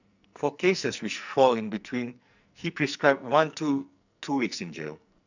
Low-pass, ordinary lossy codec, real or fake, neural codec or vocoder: 7.2 kHz; none; fake; codec, 44.1 kHz, 2.6 kbps, SNAC